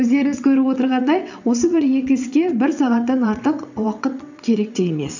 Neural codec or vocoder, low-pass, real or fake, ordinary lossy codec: vocoder, 22.05 kHz, 80 mel bands, WaveNeXt; 7.2 kHz; fake; none